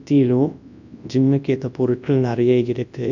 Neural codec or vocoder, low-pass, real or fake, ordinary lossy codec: codec, 24 kHz, 0.9 kbps, WavTokenizer, large speech release; 7.2 kHz; fake; none